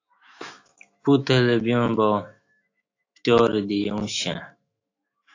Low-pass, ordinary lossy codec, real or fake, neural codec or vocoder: 7.2 kHz; AAC, 48 kbps; fake; autoencoder, 48 kHz, 128 numbers a frame, DAC-VAE, trained on Japanese speech